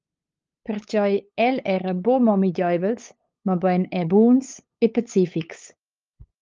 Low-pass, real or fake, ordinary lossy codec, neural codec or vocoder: 7.2 kHz; fake; Opus, 24 kbps; codec, 16 kHz, 8 kbps, FunCodec, trained on LibriTTS, 25 frames a second